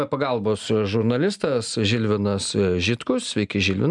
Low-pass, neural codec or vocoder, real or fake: 10.8 kHz; none; real